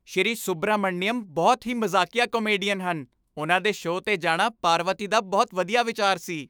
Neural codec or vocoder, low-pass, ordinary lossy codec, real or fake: autoencoder, 48 kHz, 128 numbers a frame, DAC-VAE, trained on Japanese speech; none; none; fake